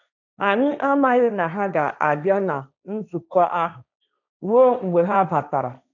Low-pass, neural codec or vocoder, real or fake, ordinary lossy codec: 7.2 kHz; codec, 16 kHz, 1.1 kbps, Voila-Tokenizer; fake; none